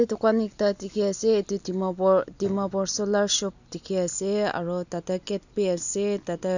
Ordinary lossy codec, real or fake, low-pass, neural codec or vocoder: none; real; 7.2 kHz; none